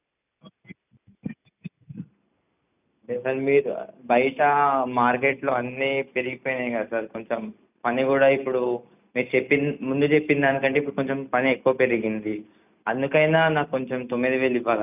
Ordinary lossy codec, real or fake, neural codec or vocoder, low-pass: none; real; none; 3.6 kHz